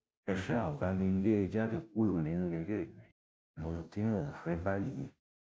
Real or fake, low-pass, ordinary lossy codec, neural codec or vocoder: fake; none; none; codec, 16 kHz, 0.5 kbps, FunCodec, trained on Chinese and English, 25 frames a second